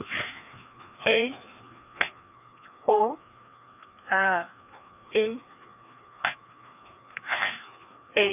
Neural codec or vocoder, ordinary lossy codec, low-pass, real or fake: codec, 16 kHz, 2 kbps, FreqCodec, larger model; none; 3.6 kHz; fake